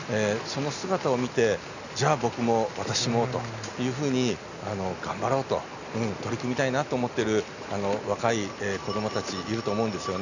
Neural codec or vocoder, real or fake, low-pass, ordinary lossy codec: none; real; 7.2 kHz; none